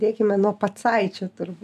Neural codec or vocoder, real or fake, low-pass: none; real; 14.4 kHz